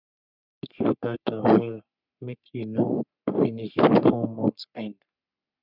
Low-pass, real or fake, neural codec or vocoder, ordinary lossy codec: 5.4 kHz; fake; codec, 44.1 kHz, 3.4 kbps, Pupu-Codec; none